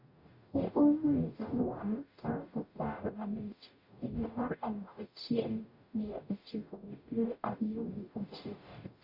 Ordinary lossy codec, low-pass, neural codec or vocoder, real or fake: Opus, 64 kbps; 5.4 kHz; codec, 44.1 kHz, 0.9 kbps, DAC; fake